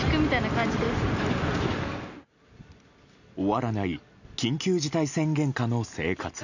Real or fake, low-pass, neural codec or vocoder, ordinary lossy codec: real; 7.2 kHz; none; none